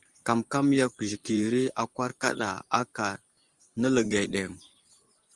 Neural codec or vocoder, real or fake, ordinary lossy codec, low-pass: vocoder, 24 kHz, 100 mel bands, Vocos; fake; Opus, 24 kbps; 10.8 kHz